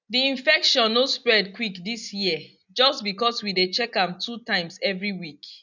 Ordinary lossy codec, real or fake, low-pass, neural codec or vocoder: none; real; 7.2 kHz; none